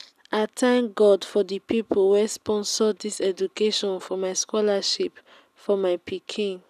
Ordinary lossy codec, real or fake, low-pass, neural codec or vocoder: none; real; 14.4 kHz; none